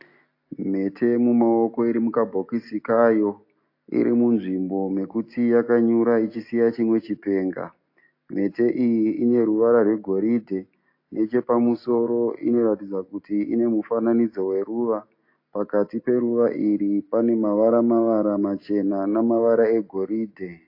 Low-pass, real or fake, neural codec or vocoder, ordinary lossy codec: 5.4 kHz; real; none; AAC, 32 kbps